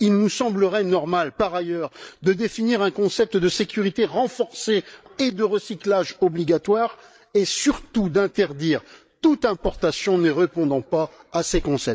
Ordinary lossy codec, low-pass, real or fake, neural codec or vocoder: none; none; fake; codec, 16 kHz, 8 kbps, FreqCodec, larger model